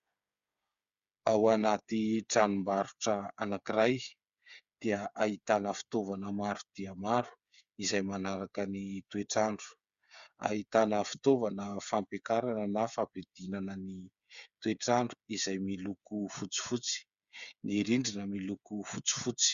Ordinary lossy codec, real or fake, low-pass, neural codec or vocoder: Opus, 64 kbps; fake; 7.2 kHz; codec, 16 kHz, 8 kbps, FreqCodec, smaller model